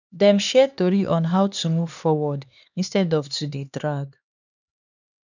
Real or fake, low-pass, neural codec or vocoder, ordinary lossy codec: fake; 7.2 kHz; codec, 16 kHz, 2 kbps, X-Codec, HuBERT features, trained on LibriSpeech; none